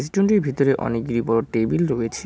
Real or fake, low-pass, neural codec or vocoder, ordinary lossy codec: real; none; none; none